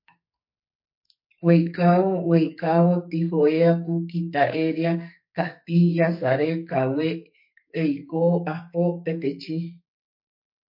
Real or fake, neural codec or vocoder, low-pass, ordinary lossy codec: fake; codec, 44.1 kHz, 2.6 kbps, SNAC; 5.4 kHz; MP3, 32 kbps